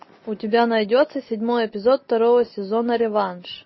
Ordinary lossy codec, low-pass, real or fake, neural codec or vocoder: MP3, 24 kbps; 7.2 kHz; real; none